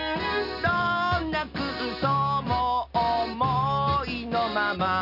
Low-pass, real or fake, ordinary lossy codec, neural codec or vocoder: 5.4 kHz; real; none; none